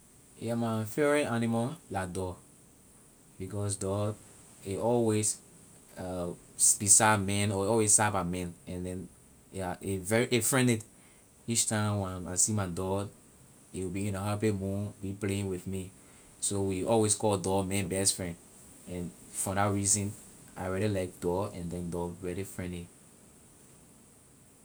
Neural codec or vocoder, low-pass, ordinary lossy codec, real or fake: none; none; none; real